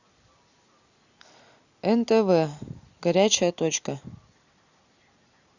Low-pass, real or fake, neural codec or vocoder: 7.2 kHz; real; none